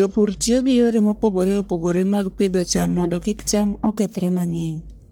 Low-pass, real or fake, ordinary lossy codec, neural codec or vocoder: none; fake; none; codec, 44.1 kHz, 1.7 kbps, Pupu-Codec